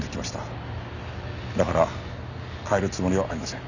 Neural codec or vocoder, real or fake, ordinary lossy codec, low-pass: none; real; none; 7.2 kHz